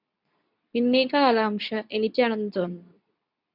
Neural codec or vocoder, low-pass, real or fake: codec, 24 kHz, 0.9 kbps, WavTokenizer, medium speech release version 2; 5.4 kHz; fake